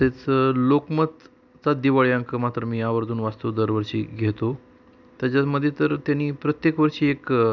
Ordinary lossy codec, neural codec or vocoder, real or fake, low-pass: none; none; real; 7.2 kHz